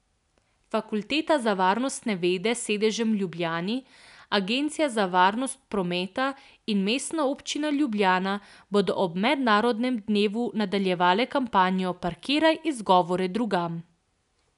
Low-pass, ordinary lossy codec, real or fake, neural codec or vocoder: 10.8 kHz; none; real; none